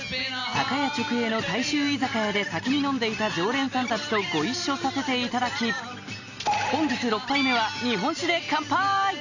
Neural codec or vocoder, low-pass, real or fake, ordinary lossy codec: none; 7.2 kHz; real; none